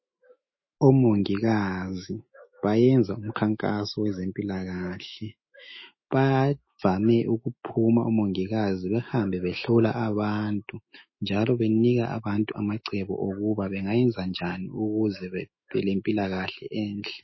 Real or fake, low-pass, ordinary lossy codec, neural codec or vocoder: fake; 7.2 kHz; MP3, 24 kbps; autoencoder, 48 kHz, 128 numbers a frame, DAC-VAE, trained on Japanese speech